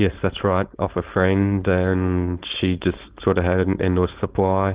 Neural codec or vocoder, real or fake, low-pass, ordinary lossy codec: codec, 16 kHz, 4.8 kbps, FACodec; fake; 3.6 kHz; Opus, 16 kbps